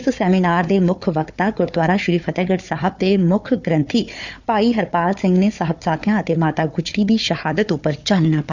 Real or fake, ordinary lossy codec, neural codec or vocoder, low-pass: fake; none; codec, 16 kHz, 4 kbps, FunCodec, trained on Chinese and English, 50 frames a second; 7.2 kHz